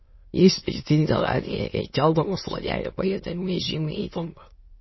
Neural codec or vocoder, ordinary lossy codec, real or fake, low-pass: autoencoder, 22.05 kHz, a latent of 192 numbers a frame, VITS, trained on many speakers; MP3, 24 kbps; fake; 7.2 kHz